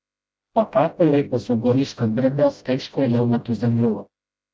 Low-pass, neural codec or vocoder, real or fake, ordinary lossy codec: none; codec, 16 kHz, 0.5 kbps, FreqCodec, smaller model; fake; none